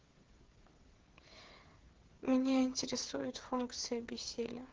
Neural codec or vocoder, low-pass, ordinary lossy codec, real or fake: codec, 16 kHz, 8 kbps, FreqCodec, smaller model; 7.2 kHz; Opus, 16 kbps; fake